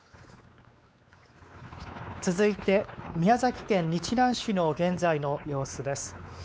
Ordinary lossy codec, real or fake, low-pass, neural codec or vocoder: none; fake; none; codec, 16 kHz, 4 kbps, X-Codec, WavLM features, trained on Multilingual LibriSpeech